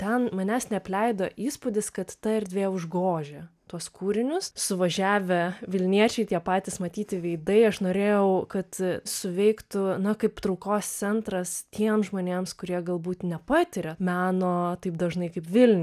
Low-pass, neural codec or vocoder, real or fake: 14.4 kHz; none; real